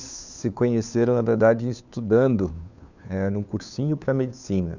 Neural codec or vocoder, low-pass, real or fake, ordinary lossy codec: codec, 16 kHz, 2 kbps, FunCodec, trained on Chinese and English, 25 frames a second; 7.2 kHz; fake; none